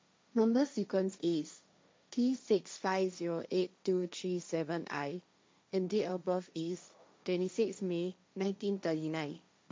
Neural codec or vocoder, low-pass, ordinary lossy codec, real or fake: codec, 16 kHz, 1.1 kbps, Voila-Tokenizer; none; none; fake